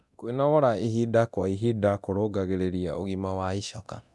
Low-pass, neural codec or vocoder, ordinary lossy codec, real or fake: none; codec, 24 kHz, 0.9 kbps, DualCodec; none; fake